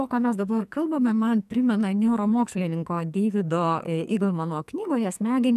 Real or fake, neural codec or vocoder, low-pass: fake; codec, 44.1 kHz, 2.6 kbps, SNAC; 14.4 kHz